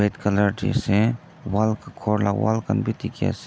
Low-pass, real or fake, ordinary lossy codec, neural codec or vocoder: none; real; none; none